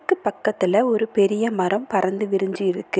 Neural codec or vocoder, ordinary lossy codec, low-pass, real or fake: none; none; none; real